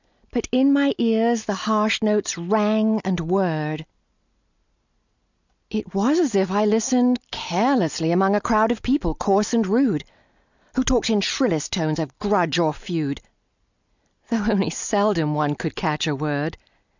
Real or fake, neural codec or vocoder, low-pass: real; none; 7.2 kHz